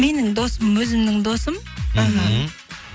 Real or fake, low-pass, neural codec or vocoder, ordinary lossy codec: real; none; none; none